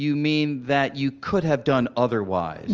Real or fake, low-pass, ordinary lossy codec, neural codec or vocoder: real; 7.2 kHz; Opus, 24 kbps; none